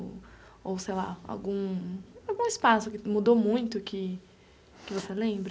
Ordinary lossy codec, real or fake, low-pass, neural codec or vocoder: none; real; none; none